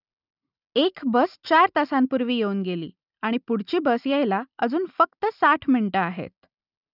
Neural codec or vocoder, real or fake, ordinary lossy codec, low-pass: none; real; none; 5.4 kHz